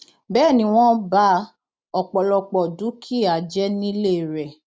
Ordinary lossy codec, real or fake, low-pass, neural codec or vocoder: none; real; none; none